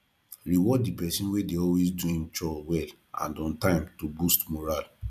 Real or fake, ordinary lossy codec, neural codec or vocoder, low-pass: fake; none; vocoder, 44.1 kHz, 128 mel bands every 256 samples, BigVGAN v2; 14.4 kHz